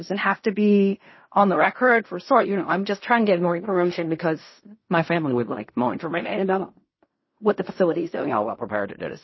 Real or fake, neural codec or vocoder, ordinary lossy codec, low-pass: fake; codec, 16 kHz in and 24 kHz out, 0.4 kbps, LongCat-Audio-Codec, fine tuned four codebook decoder; MP3, 24 kbps; 7.2 kHz